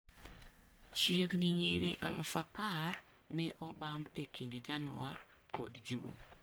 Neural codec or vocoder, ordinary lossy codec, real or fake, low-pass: codec, 44.1 kHz, 1.7 kbps, Pupu-Codec; none; fake; none